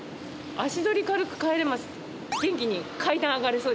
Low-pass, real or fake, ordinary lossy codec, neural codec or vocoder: none; real; none; none